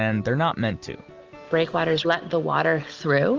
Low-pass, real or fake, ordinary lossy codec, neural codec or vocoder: 7.2 kHz; real; Opus, 24 kbps; none